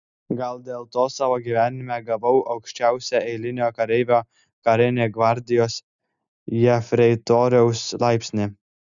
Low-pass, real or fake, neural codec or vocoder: 7.2 kHz; real; none